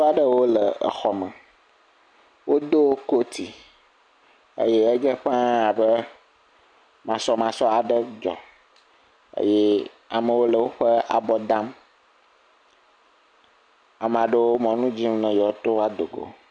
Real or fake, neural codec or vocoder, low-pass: real; none; 9.9 kHz